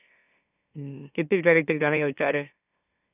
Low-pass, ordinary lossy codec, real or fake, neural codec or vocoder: 3.6 kHz; none; fake; autoencoder, 44.1 kHz, a latent of 192 numbers a frame, MeloTTS